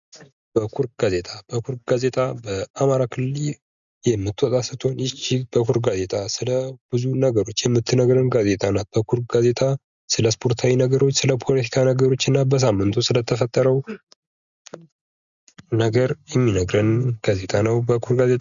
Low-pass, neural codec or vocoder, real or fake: 7.2 kHz; none; real